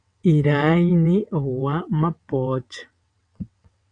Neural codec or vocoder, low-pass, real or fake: vocoder, 22.05 kHz, 80 mel bands, WaveNeXt; 9.9 kHz; fake